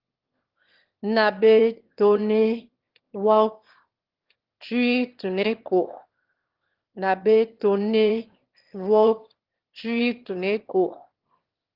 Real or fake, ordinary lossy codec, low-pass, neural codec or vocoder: fake; Opus, 16 kbps; 5.4 kHz; autoencoder, 22.05 kHz, a latent of 192 numbers a frame, VITS, trained on one speaker